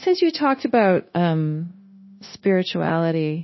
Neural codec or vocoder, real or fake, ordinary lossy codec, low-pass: codec, 16 kHz, 0.9 kbps, LongCat-Audio-Codec; fake; MP3, 24 kbps; 7.2 kHz